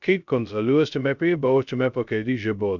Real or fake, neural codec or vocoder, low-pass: fake; codec, 16 kHz, 0.2 kbps, FocalCodec; 7.2 kHz